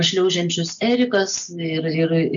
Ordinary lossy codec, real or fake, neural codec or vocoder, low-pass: MP3, 64 kbps; real; none; 7.2 kHz